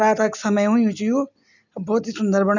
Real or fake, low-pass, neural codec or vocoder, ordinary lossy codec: real; 7.2 kHz; none; none